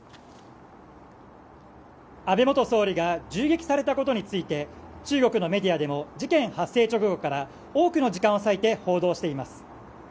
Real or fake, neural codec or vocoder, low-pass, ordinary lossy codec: real; none; none; none